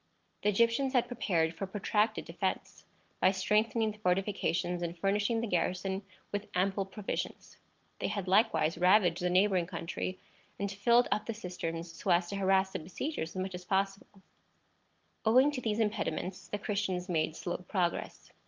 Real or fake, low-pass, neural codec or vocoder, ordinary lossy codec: real; 7.2 kHz; none; Opus, 32 kbps